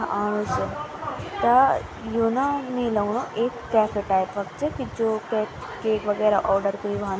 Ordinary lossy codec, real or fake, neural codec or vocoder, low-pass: none; real; none; none